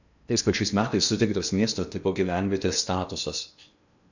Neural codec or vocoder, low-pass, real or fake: codec, 16 kHz in and 24 kHz out, 0.8 kbps, FocalCodec, streaming, 65536 codes; 7.2 kHz; fake